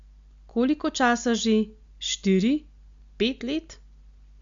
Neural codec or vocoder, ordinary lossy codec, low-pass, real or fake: none; Opus, 64 kbps; 7.2 kHz; real